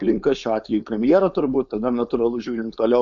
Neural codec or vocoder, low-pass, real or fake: codec, 16 kHz, 4.8 kbps, FACodec; 7.2 kHz; fake